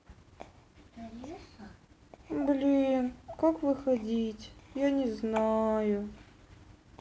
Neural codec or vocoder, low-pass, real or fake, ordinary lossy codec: none; none; real; none